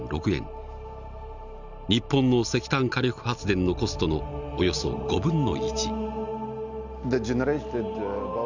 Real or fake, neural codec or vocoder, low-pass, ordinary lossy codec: real; none; 7.2 kHz; none